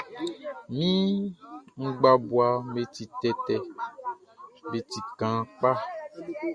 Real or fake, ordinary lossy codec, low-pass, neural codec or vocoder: real; Opus, 64 kbps; 9.9 kHz; none